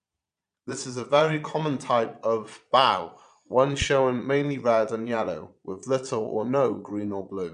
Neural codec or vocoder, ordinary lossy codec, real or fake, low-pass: vocoder, 22.05 kHz, 80 mel bands, Vocos; MP3, 96 kbps; fake; 9.9 kHz